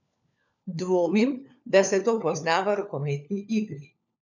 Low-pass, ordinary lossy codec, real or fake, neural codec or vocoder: 7.2 kHz; none; fake; codec, 16 kHz, 4 kbps, FunCodec, trained on LibriTTS, 50 frames a second